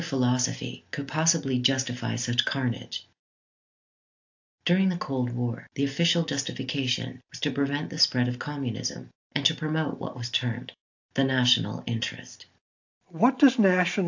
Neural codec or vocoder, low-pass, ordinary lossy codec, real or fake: none; 7.2 kHz; AAC, 48 kbps; real